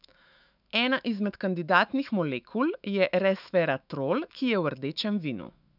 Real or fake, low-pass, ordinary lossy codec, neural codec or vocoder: fake; 5.4 kHz; none; autoencoder, 48 kHz, 128 numbers a frame, DAC-VAE, trained on Japanese speech